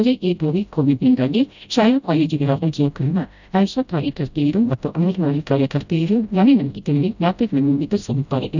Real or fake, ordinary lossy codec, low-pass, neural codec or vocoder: fake; none; 7.2 kHz; codec, 16 kHz, 0.5 kbps, FreqCodec, smaller model